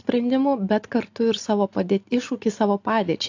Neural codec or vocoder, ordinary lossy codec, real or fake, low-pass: none; AAC, 48 kbps; real; 7.2 kHz